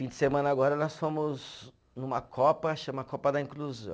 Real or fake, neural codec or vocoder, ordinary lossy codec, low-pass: real; none; none; none